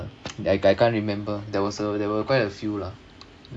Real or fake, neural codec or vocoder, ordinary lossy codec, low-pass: real; none; AAC, 64 kbps; 9.9 kHz